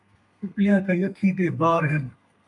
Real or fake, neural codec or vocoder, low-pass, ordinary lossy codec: fake; codec, 32 kHz, 1.9 kbps, SNAC; 10.8 kHz; MP3, 96 kbps